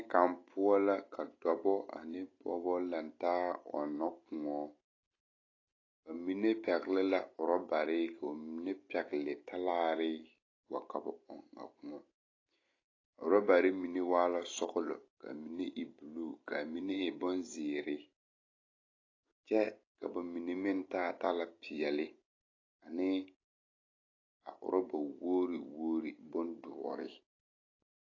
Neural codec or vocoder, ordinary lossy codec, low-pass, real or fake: none; AAC, 32 kbps; 7.2 kHz; real